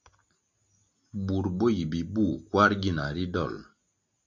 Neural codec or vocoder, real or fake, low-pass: none; real; 7.2 kHz